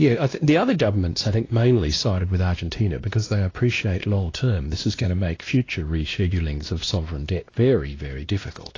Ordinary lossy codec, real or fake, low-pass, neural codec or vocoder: AAC, 32 kbps; fake; 7.2 kHz; codec, 16 kHz, 1 kbps, X-Codec, WavLM features, trained on Multilingual LibriSpeech